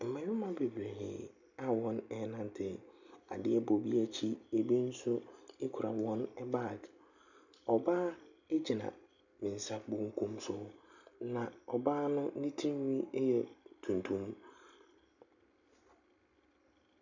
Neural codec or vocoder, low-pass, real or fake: none; 7.2 kHz; real